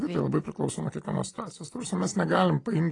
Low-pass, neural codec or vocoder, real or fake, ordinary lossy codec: 10.8 kHz; none; real; AAC, 32 kbps